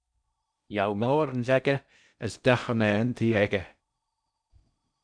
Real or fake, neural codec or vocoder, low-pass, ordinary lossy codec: fake; codec, 16 kHz in and 24 kHz out, 0.6 kbps, FocalCodec, streaming, 4096 codes; 9.9 kHz; MP3, 96 kbps